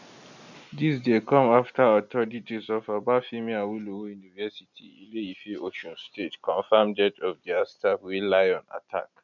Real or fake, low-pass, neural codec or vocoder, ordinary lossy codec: real; 7.2 kHz; none; none